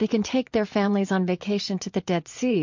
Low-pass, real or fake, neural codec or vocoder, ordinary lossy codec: 7.2 kHz; fake; vocoder, 44.1 kHz, 80 mel bands, Vocos; MP3, 48 kbps